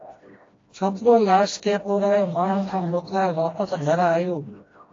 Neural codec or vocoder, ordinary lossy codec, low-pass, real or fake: codec, 16 kHz, 1 kbps, FreqCodec, smaller model; AAC, 32 kbps; 7.2 kHz; fake